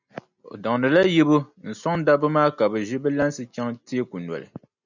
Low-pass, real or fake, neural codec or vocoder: 7.2 kHz; real; none